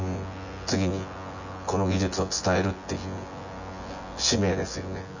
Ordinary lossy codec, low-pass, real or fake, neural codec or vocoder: none; 7.2 kHz; fake; vocoder, 24 kHz, 100 mel bands, Vocos